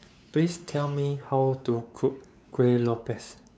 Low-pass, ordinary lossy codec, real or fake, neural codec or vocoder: none; none; fake; codec, 16 kHz, 4 kbps, X-Codec, WavLM features, trained on Multilingual LibriSpeech